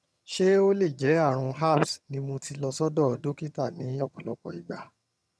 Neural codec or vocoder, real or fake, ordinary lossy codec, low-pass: vocoder, 22.05 kHz, 80 mel bands, HiFi-GAN; fake; none; none